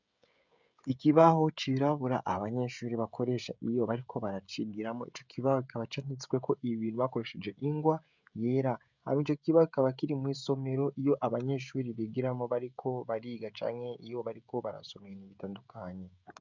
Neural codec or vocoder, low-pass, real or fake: codec, 16 kHz, 16 kbps, FreqCodec, smaller model; 7.2 kHz; fake